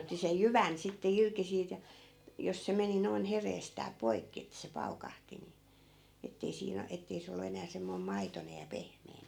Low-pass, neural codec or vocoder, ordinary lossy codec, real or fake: 19.8 kHz; none; none; real